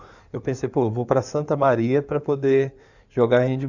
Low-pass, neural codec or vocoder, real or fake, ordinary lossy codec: 7.2 kHz; codec, 16 kHz in and 24 kHz out, 2.2 kbps, FireRedTTS-2 codec; fake; none